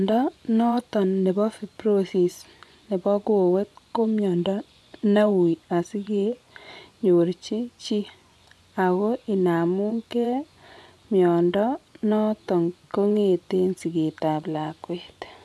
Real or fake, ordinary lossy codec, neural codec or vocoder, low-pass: fake; none; vocoder, 24 kHz, 100 mel bands, Vocos; none